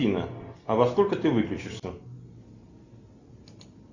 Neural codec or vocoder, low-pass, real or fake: none; 7.2 kHz; real